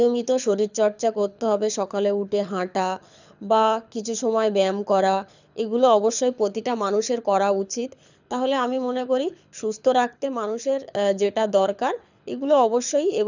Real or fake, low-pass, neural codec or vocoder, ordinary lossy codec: fake; 7.2 kHz; codec, 24 kHz, 6 kbps, HILCodec; none